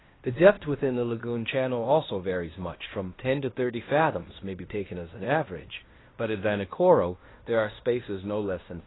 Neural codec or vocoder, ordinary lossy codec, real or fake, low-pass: codec, 16 kHz in and 24 kHz out, 0.9 kbps, LongCat-Audio-Codec, fine tuned four codebook decoder; AAC, 16 kbps; fake; 7.2 kHz